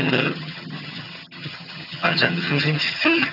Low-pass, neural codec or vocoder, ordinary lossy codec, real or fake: 5.4 kHz; vocoder, 22.05 kHz, 80 mel bands, HiFi-GAN; none; fake